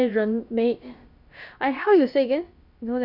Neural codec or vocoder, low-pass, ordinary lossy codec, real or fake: codec, 16 kHz, about 1 kbps, DyCAST, with the encoder's durations; 5.4 kHz; Opus, 64 kbps; fake